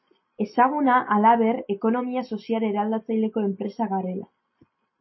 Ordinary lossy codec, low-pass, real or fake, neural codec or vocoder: MP3, 24 kbps; 7.2 kHz; real; none